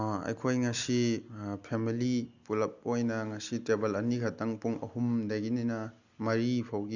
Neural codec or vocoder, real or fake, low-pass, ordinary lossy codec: none; real; 7.2 kHz; Opus, 64 kbps